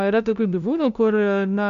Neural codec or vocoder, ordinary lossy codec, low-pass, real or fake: codec, 16 kHz, 0.5 kbps, FunCodec, trained on LibriTTS, 25 frames a second; MP3, 96 kbps; 7.2 kHz; fake